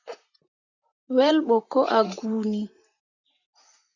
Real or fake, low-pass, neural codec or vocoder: fake; 7.2 kHz; vocoder, 44.1 kHz, 128 mel bands, Pupu-Vocoder